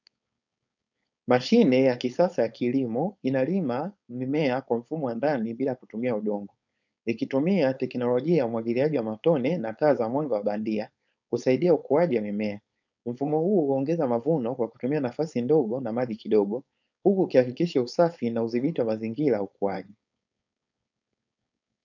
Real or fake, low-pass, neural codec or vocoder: fake; 7.2 kHz; codec, 16 kHz, 4.8 kbps, FACodec